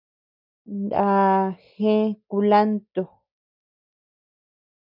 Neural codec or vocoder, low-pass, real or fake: none; 5.4 kHz; real